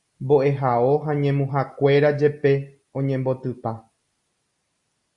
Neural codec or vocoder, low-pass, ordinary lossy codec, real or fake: none; 10.8 kHz; Opus, 64 kbps; real